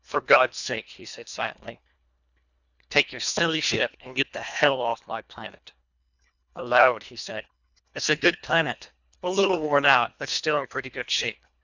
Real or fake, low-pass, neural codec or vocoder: fake; 7.2 kHz; codec, 24 kHz, 1.5 kbps, HILCodec